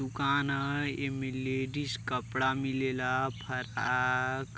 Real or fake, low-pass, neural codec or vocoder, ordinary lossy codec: real; none; none; none